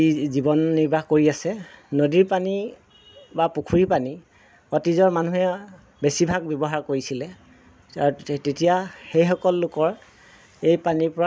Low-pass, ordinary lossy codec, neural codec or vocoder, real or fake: none; none; none; real